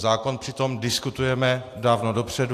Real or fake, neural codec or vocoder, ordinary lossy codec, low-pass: real; none; AAC, 64 kbps; 14.4 kHz